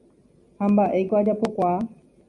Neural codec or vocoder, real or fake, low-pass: none; real; 10.8 kHz